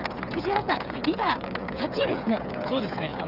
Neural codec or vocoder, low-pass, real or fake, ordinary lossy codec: codec, 16 kHz, 8 kbps, FreqCodec, smaller model; 5.4 kHz; fake; none